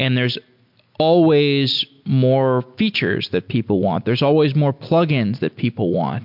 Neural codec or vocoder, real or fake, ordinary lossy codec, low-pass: none; real; MP3, 48 kbps; 5.4 kHz